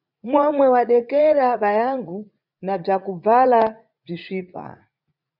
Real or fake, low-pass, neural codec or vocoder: fake; 5.4 kHz; vocoder, 44.1 kHz, 80 mel bands, Vocos